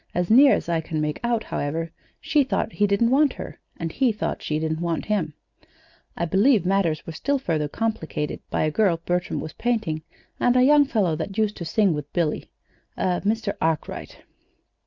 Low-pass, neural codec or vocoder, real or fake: 7.2 kHz; none; real